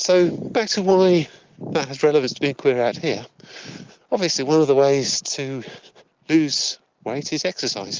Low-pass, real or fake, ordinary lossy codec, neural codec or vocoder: 7.2 kHz; real; Opus, 32 kbps; none